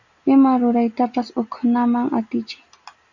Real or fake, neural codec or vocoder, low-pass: real; none; 7.2 kHz